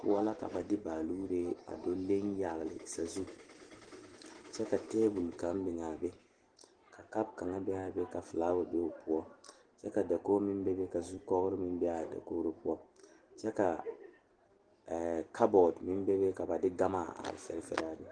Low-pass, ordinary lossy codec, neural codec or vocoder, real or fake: 9.9 kHz; Opus, 16 kbps; none; real